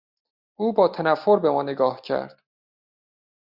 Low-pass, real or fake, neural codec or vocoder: 5.4 kHz; real; none